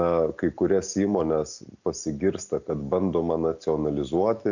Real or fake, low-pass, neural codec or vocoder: real; 7.2 kHz; none